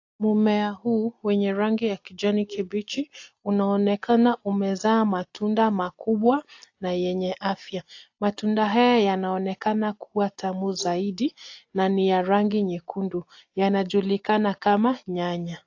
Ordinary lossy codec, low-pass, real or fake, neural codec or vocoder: AAC, 48 kbps; 7.2 kHz; real; none